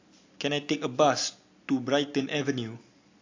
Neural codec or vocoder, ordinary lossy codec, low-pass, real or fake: none; AAC, 48 kbps; 7.2 kHz; real